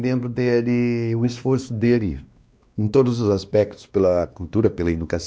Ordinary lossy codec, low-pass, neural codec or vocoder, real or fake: none; none; codec, 16 kHz, 2 kbps, X-Codec, WavLM features, trained on Multilingual LibriSpeech; fake